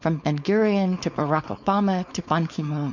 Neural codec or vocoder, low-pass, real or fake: codec, 16 kHz, 4.8 kbps, FACodec; 7.2 kHz; fake